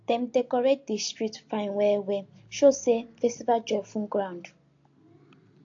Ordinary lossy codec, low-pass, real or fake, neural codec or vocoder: AAC, 48 kbps; 7.2 kHz; real; none